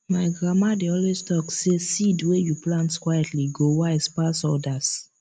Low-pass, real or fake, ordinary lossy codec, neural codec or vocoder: 9.9 kHz; real; MP3, 96 kbps; none